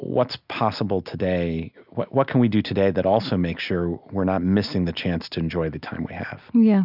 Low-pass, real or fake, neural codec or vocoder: 5.4 kHz; real; none